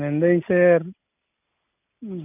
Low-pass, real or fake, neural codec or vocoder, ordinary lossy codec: 3.6 kHz; real; none; none